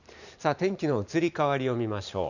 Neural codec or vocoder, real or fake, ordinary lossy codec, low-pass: vocoder, 44.1 kHz, 128 mel bands every 512 samples, BigVGAN v2; fake; none; 7.2 kHz